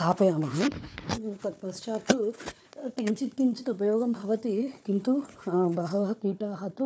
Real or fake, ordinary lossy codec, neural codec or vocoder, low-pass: fake; none; codec, 16 kHz, 4 kbps, FunCodec, trained on Chinese and English, 50 frames a second; none